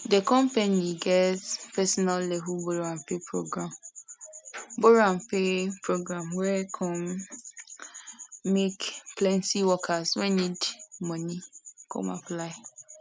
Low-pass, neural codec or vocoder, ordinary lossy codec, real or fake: none; none; none; real